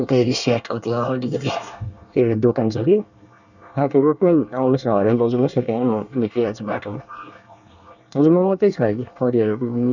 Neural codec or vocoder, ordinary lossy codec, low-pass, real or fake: codec, 24 kHz, 1 kbps, SNAC; none; 7.2 kHz; fake